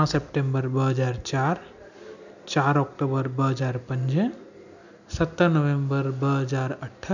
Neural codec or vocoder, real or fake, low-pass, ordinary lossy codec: none; real; 7.2 kHz; none